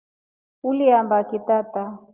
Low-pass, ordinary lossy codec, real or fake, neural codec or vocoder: 3.6 kHz; Opus, 24 kbps; real; none